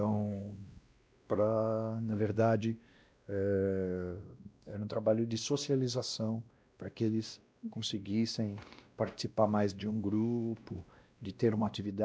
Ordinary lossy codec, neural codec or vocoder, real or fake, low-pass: none; codec, 16 kHz, 1 kbps, X-Codec, WavLM features, trained on Multilingual LibriSpeech; fake; none